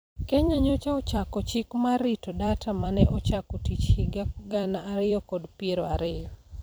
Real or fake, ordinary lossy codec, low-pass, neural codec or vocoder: fake; none; none; vocoder, 44.1 kHz, 128 mel bands every 256 samples, BigVGAN v2